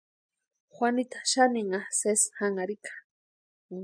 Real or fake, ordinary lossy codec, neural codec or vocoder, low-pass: real; MP3, 96 kbps; none; 9.9 kHz